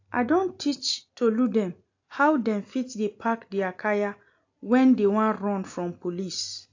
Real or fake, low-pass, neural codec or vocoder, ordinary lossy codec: real; 7.2 kHz; none; AAC, 48 kbps